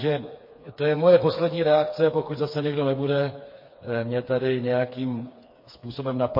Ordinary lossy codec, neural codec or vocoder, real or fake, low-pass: MP3, 24 kbps; codec, 16 kHz, 4 kbps, FreqCodec, smaller model; fake; 5.4 kHz